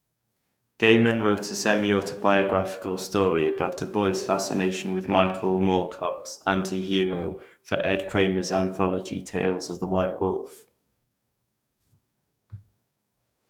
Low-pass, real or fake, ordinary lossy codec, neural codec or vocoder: 19.8 kHz; fake; none; codec, 44.1 kHz, 2.6 kbps, DAC